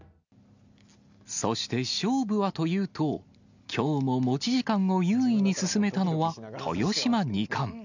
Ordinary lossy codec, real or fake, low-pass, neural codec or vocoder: none; real; 7.2 kHz; none